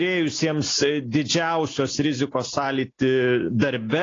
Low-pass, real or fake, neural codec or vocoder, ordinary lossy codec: 7.2 kHz; real; none; AAC, 32 kbps